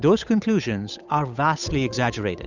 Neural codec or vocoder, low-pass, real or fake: codec, 16 kHz, 8 kbps, FunCodec, trained on Chinese and English, 25 frames a second; 7.2 kHz; fake